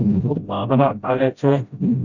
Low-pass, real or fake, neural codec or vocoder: 7.2 kHz; fake; codec, 16 kHz, 0.5 kbps, FreqCodec, smaller model